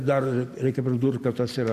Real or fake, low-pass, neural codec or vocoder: real; 14.4 kHz; none